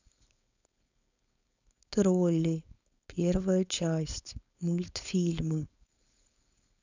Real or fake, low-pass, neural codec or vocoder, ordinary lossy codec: fake; 7.2 kHz; codec, 16 kHz, 4.8 kbps, FACodec; none